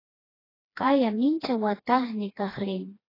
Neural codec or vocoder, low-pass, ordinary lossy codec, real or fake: codec, 16 kHz, 2 kbps, FreqCodec, smaller model; 5.4 kHz; AAC, 24 kbps; fake